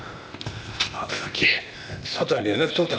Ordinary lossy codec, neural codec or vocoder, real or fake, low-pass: none; codec, 16 kHz, 0.8 kbps, ZipCodec; fake; none